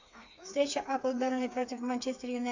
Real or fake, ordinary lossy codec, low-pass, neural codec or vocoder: fake; AAC, 48 kbps; 7.2 kHz; codec, 16 kHz, 4 kbps, FreqCodec, smaller model